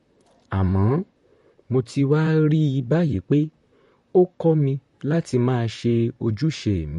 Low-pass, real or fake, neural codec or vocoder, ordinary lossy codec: 14.4 kHz; fake; vocoder, 44.1 kHz, 128 mel bands, Pupu-Vocoder; MP3, 48 kbps